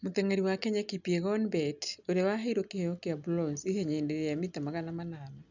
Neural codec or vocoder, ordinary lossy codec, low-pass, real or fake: none; none; 7.2 kHz; real